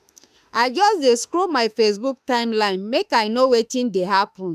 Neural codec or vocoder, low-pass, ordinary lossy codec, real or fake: autoencoder, 48 kHz, 32 numbers a frame, DAC-VAE, trained on Japanese speech; 14.4 kHz; none; fake